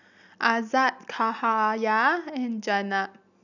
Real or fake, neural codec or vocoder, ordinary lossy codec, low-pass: real; none; none; 7.2 kHz